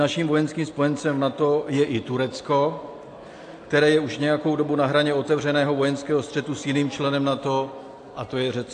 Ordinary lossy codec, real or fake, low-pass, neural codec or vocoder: AAC, 48 kbps; real; 9.9 kHz; none